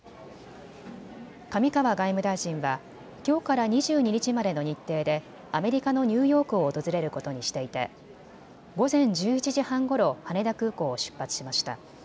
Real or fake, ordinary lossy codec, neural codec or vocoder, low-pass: real; none; none; none